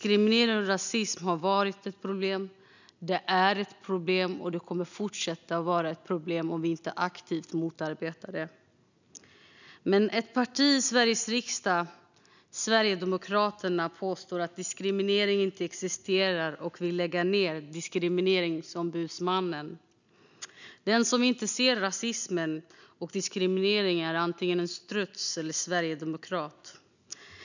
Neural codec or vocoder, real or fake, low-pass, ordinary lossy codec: none; real; 7.2 kHz; none